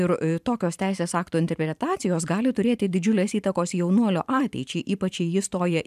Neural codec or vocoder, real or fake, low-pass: vocoder, 44.1 kHz, 128 mel bands every 512 samples, BigVGAN v2; fake; 14.4 kHz